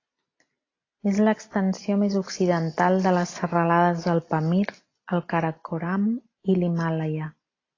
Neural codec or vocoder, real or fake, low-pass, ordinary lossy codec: none; real; 7.2 kHz; AAC, 32 kbps